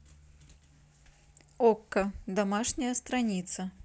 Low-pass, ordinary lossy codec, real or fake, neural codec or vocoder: none; none; real; none